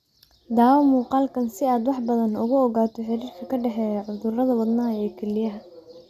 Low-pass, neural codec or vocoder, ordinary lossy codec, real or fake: 14.4 kHz; none; none; real